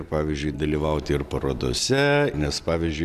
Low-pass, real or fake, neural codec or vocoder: 14.4 kHz; real; none